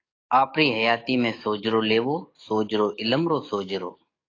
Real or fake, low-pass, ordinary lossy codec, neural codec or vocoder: fake; 7.2 kHz; AAC, 32 kbps; codec, 44.1 kHz, 7.8 kbps, DAC